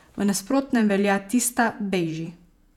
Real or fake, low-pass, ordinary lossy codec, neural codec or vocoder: fake; 19.8 kHz; none; vocoder, 48 kHz, 128 mel bands, Vocos